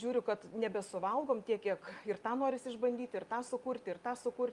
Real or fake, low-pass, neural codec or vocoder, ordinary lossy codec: real; 10.8 kHz; none; Opus, 32 kbps